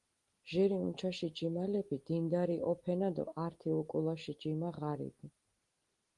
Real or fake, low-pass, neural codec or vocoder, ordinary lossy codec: real; 10.8 kHz; none; Opus, 24 kbps